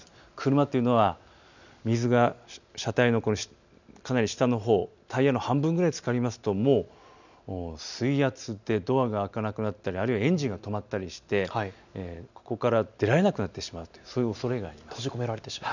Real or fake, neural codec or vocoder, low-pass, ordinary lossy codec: real; none; 7.2 kHz; none